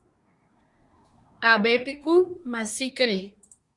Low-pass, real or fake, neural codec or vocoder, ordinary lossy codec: 10.8 kHz; fake; codec, 24 kHz, 1 kbps, SNAC; Opus, 64 kbps